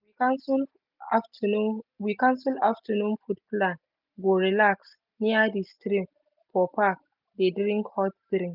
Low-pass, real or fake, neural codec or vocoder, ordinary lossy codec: 5.4 kHz; real; none; none